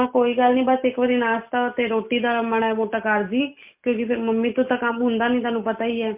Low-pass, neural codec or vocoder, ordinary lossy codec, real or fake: 3.6 kHz; none; MP3, 32 kbps; real